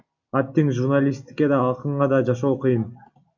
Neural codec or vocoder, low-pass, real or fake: none; 7.2 kHz; real